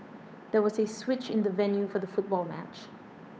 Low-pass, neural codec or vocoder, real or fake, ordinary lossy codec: none; codec, 16 kHz, 8 kbps, FunCodec, trained on Chinese and English, 25 frames a second; fake; none